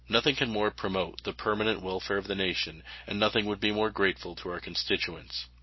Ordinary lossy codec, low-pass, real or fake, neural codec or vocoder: MP3, 24 kbps; 7.2 kHz; real; none